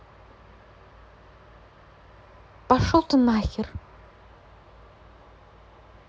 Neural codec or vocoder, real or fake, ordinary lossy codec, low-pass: none; real; none; none